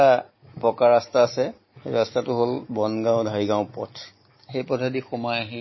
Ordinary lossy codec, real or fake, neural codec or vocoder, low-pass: MP3, 24 kbps; real; none; 7.2 kHz